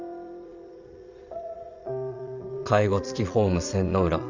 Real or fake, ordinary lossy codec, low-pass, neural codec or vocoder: fake; Opus, 64 kbps; 7.2 kHz; vocoder, 22.05 kHz, 80 mel bands, Vocos